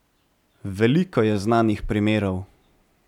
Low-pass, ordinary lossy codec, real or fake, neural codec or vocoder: 19.8 kHz; none; real; none